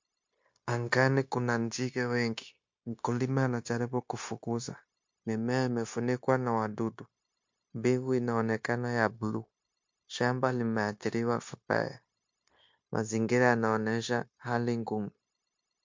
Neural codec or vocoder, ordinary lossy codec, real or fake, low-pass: codec, 16 kHz, 0.9 kbps, LongCat-Audio-Codec; MP3, 48 kbps; fake; 7.2 kHz